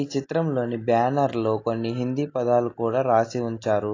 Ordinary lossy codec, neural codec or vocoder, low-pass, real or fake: AAC, 32 kbps; none; 7.2 kHz; real